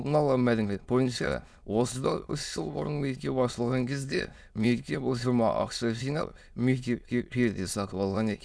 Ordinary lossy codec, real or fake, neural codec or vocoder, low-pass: none; fake; autoencoder, 22.05 kHz, a latent of 192 numbers a frame, VITS, trained on many speakers; none